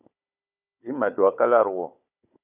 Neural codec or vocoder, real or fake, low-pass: codec, 16 kHz, 16 kbps, FunCodec, trained on Chinese and English, 50 frames a second; fake; 3.6 kHz